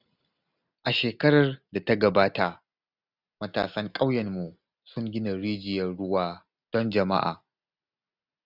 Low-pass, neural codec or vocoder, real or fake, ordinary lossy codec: 5.4 kHz; none; real; none